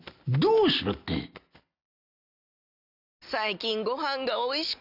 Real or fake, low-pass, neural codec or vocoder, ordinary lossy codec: real; 5.4 kHz; none; none